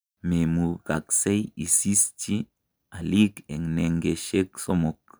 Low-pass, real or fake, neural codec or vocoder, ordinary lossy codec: none; real; none; none